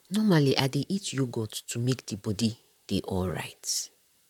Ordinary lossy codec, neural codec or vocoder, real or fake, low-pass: none; vocoder, 44.1 kHz, 128 mel bands, Pupu-Vocoder; fake; 19.8 kHz